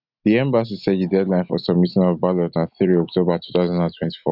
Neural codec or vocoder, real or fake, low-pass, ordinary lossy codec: none; real; 5.4 kHz; none